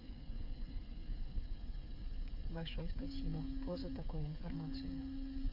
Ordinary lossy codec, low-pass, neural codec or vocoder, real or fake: none; 5.4 kHz; codec, 16 kHz, 8 kbps, FreqCodec, smaller model; fake